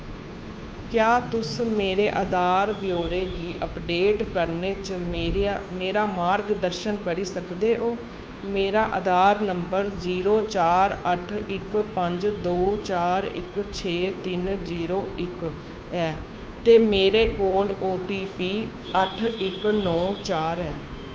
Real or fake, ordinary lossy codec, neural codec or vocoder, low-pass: fake; none; codec, 16 kHz, 2 kbps, FunCodec, trained on Chinese and English, 25 frames a second; none